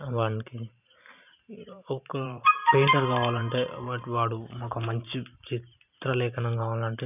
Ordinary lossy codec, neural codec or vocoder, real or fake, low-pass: none; vocoder, 44.1 kHz, 128 mel bands every 512 samples, BigVGAN v2; fake; 3.6 kHz